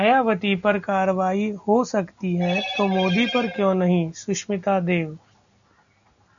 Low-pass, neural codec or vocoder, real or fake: 7.2 kHz; none; real